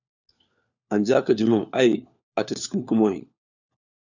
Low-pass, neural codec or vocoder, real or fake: 7.2 kHz; codec, 16 kHz, 4 kbps, FunCodec, trained on LibriTTS, 50 frames a second; fake